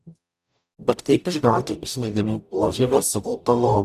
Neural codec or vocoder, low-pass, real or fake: codec, 44.1 kHz, 0.9 kbps, DAC; 14.4 kHz; fake